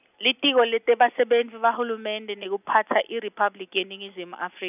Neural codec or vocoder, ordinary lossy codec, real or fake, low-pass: none; none; real; 3.6 kHz